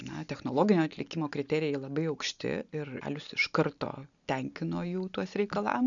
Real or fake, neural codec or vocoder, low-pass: real; none; 7.2 kHz